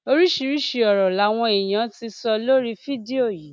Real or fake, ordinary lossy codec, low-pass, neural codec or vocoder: real; none; none; none